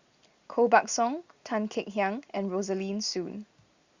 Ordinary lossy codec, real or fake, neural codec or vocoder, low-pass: Opus, 64 kbps; real; none; 7.2 kHz